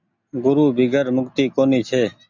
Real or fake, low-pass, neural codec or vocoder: real; 7.2 kHz; none